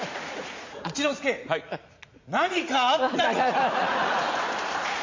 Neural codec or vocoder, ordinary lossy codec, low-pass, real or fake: vocoder, 44.1 kHz, 80 mel bands, Vocos; MP3, 48 kbps; 7.2 kHz; fake